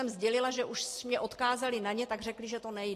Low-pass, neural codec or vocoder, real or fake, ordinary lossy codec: 14.4 kHz; none; real; AAC, 48 kbps